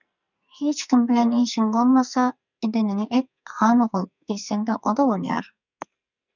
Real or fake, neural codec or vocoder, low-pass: fake; codec, 44.1 kHz, 2.6 kbps, SNAC; 7.2 kHz